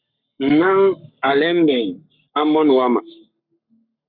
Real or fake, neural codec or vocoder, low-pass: fake; codec, 44.1 kHz, 7.8 kbps, Pupu-Codec; 5.4 kHz